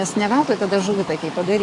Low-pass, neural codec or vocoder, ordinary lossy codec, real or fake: 10.8 kHz; codec, 44.1 kHz, 7.8 kbps, DAC; AAC, 48 kbps; fake